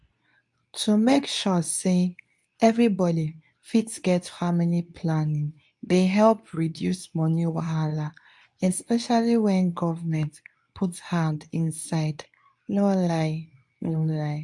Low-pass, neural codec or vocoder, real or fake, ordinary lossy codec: 10.8 kHz; codec, 24 kHz, 0.9 kbps, WavTokenizer, medium speech release version 2; fake; MP3, 64 kbps